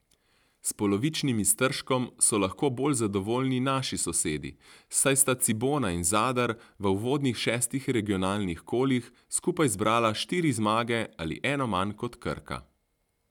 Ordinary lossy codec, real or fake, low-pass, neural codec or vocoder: none; real; 19.8 kHz; none